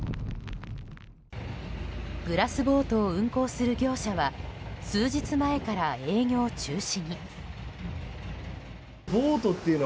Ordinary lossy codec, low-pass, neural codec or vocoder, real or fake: none; none; none; real